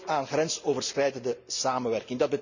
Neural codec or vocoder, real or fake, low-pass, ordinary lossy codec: none; real; 7.2 kHz; none